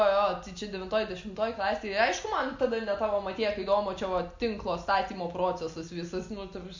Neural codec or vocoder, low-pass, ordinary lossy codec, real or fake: none; 7.2 kHz; MP3, 64 kbps; real